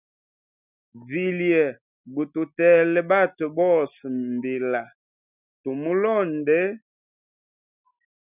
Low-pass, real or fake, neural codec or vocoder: 3.6 kHz; real; none